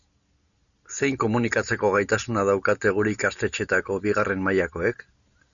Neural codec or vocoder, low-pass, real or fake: none; 7.2 kHz; real